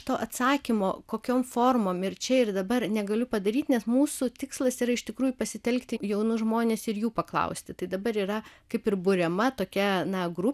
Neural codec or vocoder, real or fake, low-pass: none; real; 14.4 kHz